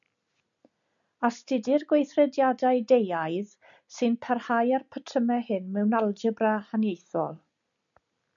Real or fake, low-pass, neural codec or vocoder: real; 7.2 kHz; none